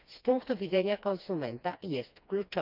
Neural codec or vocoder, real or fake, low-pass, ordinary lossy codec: codec, 16 kHz, 2 kbps, FreqCodec, smaller model; fake; 5.4 kHz; none